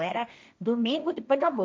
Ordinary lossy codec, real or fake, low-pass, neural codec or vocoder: none; fake; none; codec, 16 kHz, 1.1 kbps, Voila-Tokenizer